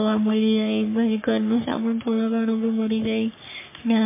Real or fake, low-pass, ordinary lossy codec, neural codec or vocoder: fake; 3.6 kHz; MP3, 24 kbps; codec, 44.1 kHz, 3.4 kbps, Pupu-Codec